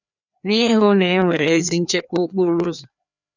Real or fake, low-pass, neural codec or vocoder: fake; 7.2 kHz; codec, 16 kHz, 2 kbps, FreqCodec, larger model